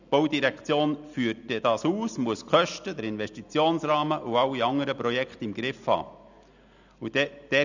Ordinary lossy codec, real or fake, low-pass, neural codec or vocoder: none; real; 7.2 kHz; none